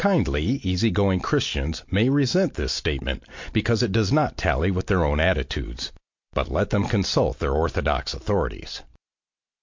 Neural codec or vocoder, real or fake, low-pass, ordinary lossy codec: none; real; 7.2 kHz; MP3, 48 kbps